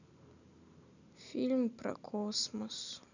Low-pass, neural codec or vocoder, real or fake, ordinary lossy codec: 7.2 kHz; vocoder, 44.1 kHz, 128 mel bands every 512 samples, BigVGAN v2; fake; none